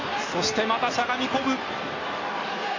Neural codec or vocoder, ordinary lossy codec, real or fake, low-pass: none; AAC, 32 kbps; real; 7.2 kHz